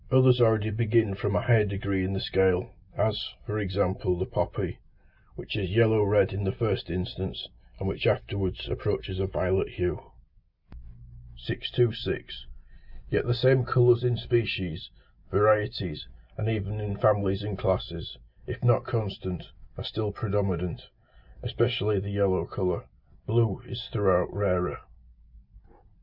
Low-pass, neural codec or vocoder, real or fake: 5.4 kHz; none; real